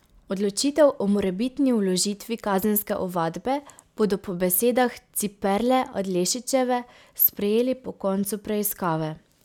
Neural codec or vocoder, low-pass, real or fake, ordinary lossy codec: none; 19.8 kHz; real; none